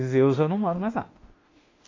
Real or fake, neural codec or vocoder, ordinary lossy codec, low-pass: fake; autoencoder, 48 kHz, 32 numbers a frame, DAC-VAE, trained on Japanese speech; AAC, 32 kbps; 7.2 kHz